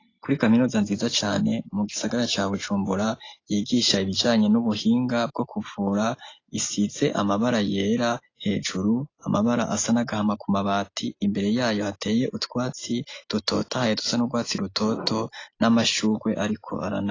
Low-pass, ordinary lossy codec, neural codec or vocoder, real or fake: 7.2 kHz; AAC, 32 kbps; none; real